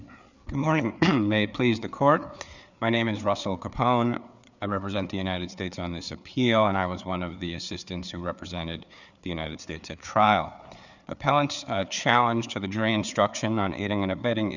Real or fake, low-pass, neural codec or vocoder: fake; 7.2 kHz; codec, 16 kHz, 4 kbps, FreqCodec, larger model